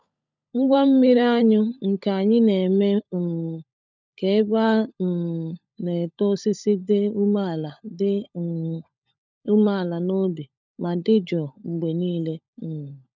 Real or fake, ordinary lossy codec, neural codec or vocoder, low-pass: fake; none; codec, 16 kHz, 16 kbps, FunCodec, trained on LibriTTS, 50 frames a second; 7.2 kHz